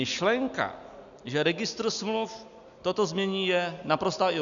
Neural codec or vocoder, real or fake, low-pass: none; real; 7.2 kHz